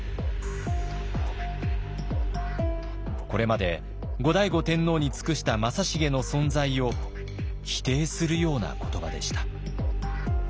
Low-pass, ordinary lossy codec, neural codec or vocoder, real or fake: none; none; none; real